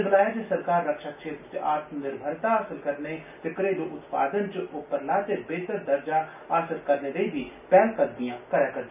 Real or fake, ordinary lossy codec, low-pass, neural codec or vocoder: real; none; 3.6 kHz; none